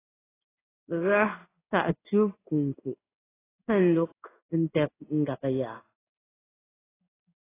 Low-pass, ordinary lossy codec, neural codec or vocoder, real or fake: 3.6 kHz; AAC, 16 kbps; codec, 16 kHz in and 24 kHz out, 1 kbps, XY-Tokenizer; fake